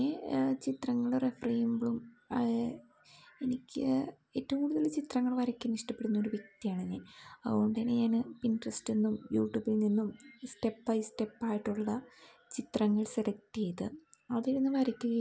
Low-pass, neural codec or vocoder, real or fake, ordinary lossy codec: none; none; real; none